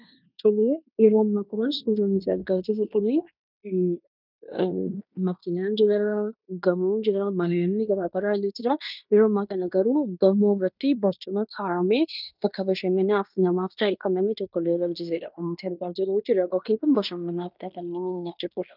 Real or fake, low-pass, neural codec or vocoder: fake; 5.4 kHz; codec, 16 kHz in and 24 kHz out, 0.9 kbps, LongCat-Audio-Codec, fine tuned four codebook decoder